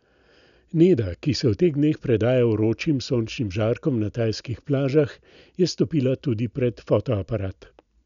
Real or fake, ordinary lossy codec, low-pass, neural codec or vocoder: real; none; 7.2 kHz; none